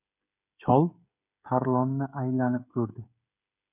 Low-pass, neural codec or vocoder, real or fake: 3.6 kHz; codec, 16 kHz, 16 kbps, FreqCodec, smaller model; fake